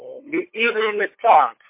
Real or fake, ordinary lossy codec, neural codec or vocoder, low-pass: fake; MP3, 24 kbps; codec, 16 kHz, 8 kbps, FunCodec, trained on LibriTTS, 25 frames a second; 3.6 kHz